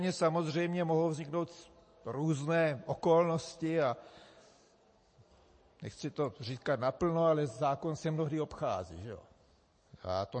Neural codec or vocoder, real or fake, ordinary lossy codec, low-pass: none; real; MP3, 32 kbps; 9.9 kHz